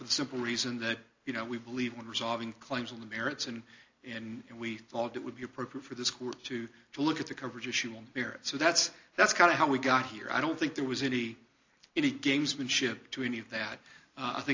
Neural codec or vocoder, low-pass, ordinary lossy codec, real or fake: none; 7.2 kHz; AAC, 48 kbps; real